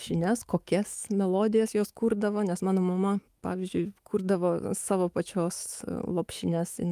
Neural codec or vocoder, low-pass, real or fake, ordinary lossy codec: autoencoder, 48 kHz, 128 numbers a frame, DAC-VAE, trained on Japanese speech; 14.4 kHz; fake; Opus, 32 kbps